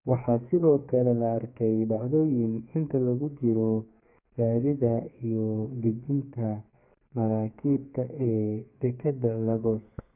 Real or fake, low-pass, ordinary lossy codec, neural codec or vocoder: fake; 3.6 kHz; none; codec, 32 kHz, 1.9 kbps, SNAC